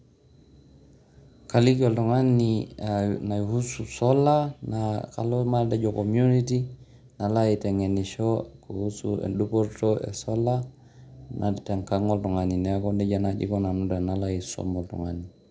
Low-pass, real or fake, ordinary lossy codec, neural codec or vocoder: none; real; none; none